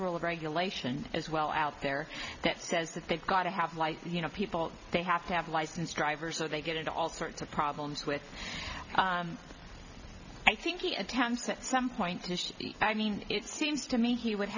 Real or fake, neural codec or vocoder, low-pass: real; none; 7.2 kHz